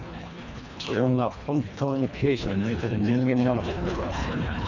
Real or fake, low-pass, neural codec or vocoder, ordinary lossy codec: fake; 7.2 kHz; codec, 24 kHz, 1.5 kbps, HILCodec; none